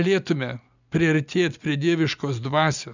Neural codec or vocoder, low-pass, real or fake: none; 7.2 kHz; real